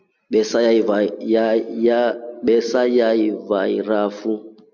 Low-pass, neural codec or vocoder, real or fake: 7.2 kHz; none; real